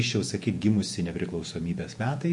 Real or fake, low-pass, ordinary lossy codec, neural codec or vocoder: fake; 10.8 kHz; MP3, 48 kbps; vocoder, 48 kHz, 128 mel bands, Vocos